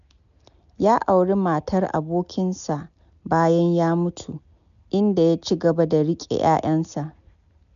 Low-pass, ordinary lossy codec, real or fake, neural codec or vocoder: 7.2 kHz; none; real; none